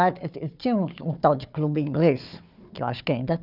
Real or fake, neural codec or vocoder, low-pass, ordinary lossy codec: fake; codec, 16 kHz, 4 kbps, FunCodec, trained on Chinese and English, 50 frames a second; 5.4 kHz; none